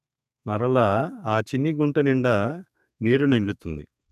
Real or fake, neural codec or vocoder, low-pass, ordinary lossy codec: fake; codec, 32 kHz, 1.9 kbps, SNAC; 14.4 kHz; none